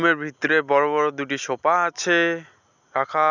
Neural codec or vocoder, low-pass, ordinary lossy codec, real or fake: none; 7.2 kHz; none; real